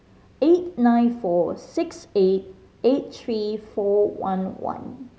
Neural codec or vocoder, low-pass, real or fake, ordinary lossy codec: none; none; real; none